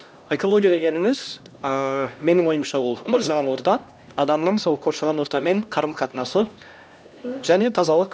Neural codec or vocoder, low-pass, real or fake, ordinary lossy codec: codec, 16 kHz, 1 kbps, X-Codec, HuBERT features, trained on LibriSpeech; none; fake; none